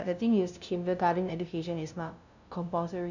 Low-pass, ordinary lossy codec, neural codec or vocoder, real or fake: 7.2 kHz; none; codec, 16 kHz, 0.5 kbps, FunCodec, trained on LibriTTS, 25 frames a second; fake